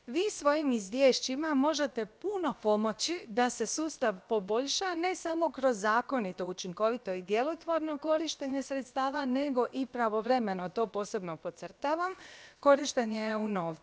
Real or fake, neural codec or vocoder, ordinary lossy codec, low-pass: fake; codec, 16 kHz, about 1 kbps, DyCAST, with the encoder's durations; none; none